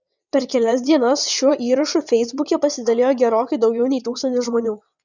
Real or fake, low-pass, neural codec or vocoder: fake; 7.2 kHz; vocoder, 22.05 kHz, 80 mel bands, WaveNeXt